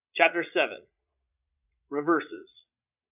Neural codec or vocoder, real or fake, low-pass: vocoder, 22.05 kHz, 80 mel bands, Vocos; fake; 3.6 kHz